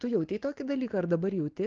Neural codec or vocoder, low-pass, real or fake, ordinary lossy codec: none; 7.2 kHz; real; Opus, 16 kbps